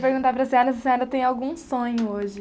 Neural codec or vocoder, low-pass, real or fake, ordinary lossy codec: none; none; real; none